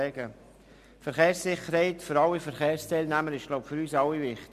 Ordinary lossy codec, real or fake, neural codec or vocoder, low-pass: MP3, 96 kbps; real; none; 14.4 kHz